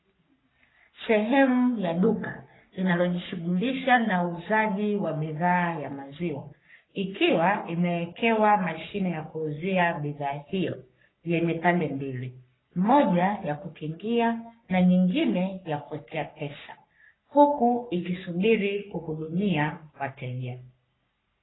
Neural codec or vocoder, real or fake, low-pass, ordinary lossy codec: codec, 44.1 kHz, 3.4 kbps, Pupu-Codec; fake; 7.2 kHz; AAC, 16 kbps